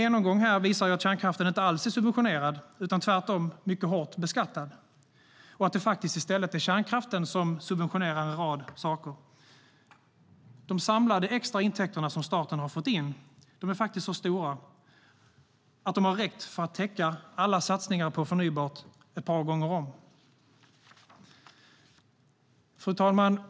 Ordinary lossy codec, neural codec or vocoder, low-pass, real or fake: none; none; none; real